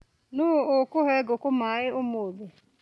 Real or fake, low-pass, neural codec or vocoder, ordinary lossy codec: real; none; none; none